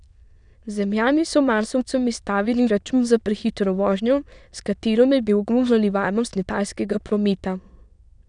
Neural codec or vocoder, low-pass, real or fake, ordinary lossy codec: autoencoder, 22.05 kHz, a latent of 192 numbers a frame, VITS, trained on many speakers; 9.9 kHz; fake; none